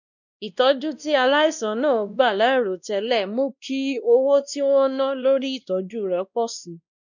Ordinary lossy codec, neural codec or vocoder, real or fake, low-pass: none; codec, 16 kHz, 1 kbps, X-Codec, WavLM features, trained on Multilingual LibriSpeech; fake; 7.2 kHz